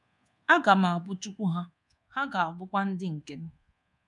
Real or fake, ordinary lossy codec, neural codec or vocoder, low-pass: fake; none; codec, 24 kHz, 1.2 kbps, DualCodec; 10.8 kHz